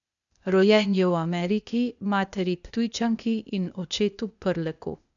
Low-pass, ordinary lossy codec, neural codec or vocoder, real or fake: 7.2 kHz; none; codec, 16 kHz, 0.8 kbps, ZipCodec; fake